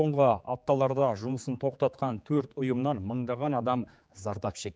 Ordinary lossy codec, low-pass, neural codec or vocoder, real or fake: none; none; codec, 16 kHz, 4 kbps, X-Codec, HuBERT features, trained on general audio; fake